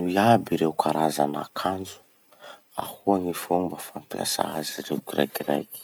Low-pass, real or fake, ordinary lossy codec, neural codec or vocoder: none; real; none; none